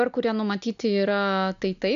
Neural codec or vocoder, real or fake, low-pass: none; real; 7.2 kHz